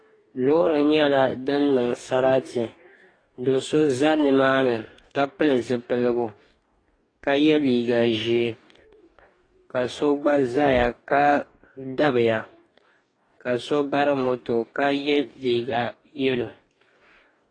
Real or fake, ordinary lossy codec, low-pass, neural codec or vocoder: fake; AAC, 32 kbps; 9.9 kHz; codec, 44.1 kHz, 2.6 kbps, DAC